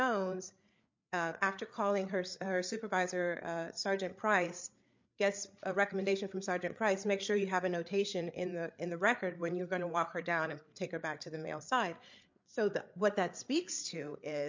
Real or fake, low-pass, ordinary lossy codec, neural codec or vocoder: fake; 7.2 kHz; MP3, 48 kbps; codec, 16 kHz, 8 kbps, FreqCodec, larger model